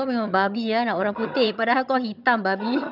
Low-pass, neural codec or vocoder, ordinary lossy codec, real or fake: 5.4 kHz; vocoder, 22.05 kHz, 80 mel bands, HiFi-GAN; none; fake